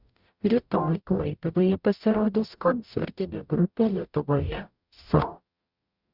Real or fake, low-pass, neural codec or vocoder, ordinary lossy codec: fake; 5.4 kHz; codec, 44.1 kHz, 0.9 kbps, DAC; Opus, 64 kbps